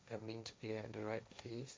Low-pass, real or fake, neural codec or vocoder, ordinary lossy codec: none; fake; codec, 16 kHz, 1.1 kbps, Voila-Tokenizer; none